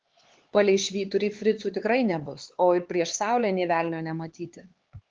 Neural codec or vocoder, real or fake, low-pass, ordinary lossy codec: codec, 16 kHz, 4 kbps, X-Codec, HuBERT features, trained on LibriSpeech; fake; 7.2 kHz; Opus, 16 kbps